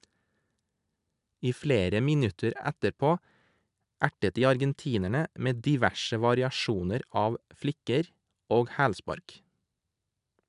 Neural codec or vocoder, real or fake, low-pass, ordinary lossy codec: none; real; 10.8 kHz; none